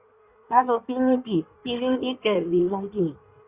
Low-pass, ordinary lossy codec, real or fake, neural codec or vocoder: 3.6 kHz; Opus, 64 kbps; fake; codec, 16 kHz in and 24 kHz out, 1.1 kbps, FireRedTTS-2 codec